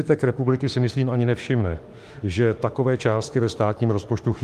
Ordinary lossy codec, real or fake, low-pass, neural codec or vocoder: Opus, 32 kbps; fake; 14.4 kHz; autoencoder, 48 kHz, 32 numbers a frame, DAC-VAE, trained on Japanese speech